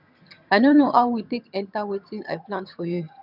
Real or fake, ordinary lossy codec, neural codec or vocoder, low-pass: fake; none; vocoder, 22.05 kHz, 80 mel bands, HiFi-GAN; 5.4 kHz